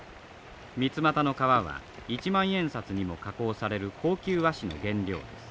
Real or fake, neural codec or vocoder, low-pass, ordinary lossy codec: real; none; none; none